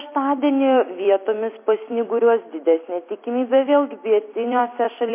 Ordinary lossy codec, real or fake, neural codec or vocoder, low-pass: MP3, 24 kbps; fake; vocoder, 44.1 kHz, 128 mel bands every 256 samples, BigVGAN v2; 3.6 kHz